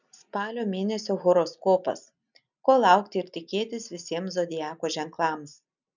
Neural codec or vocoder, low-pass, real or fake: none; 7.2 kHz; real